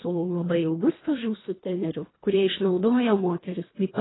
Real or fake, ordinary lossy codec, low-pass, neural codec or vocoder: fake; AAC, 16 kbps; 7.2 kHz; codec, 24 kHz, 1.5 kbps, HILCodec